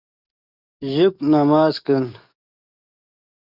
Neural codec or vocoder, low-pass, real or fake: codec, 44.1 kHz, 7.8 kbps, DAC; 5.4 kHz; fake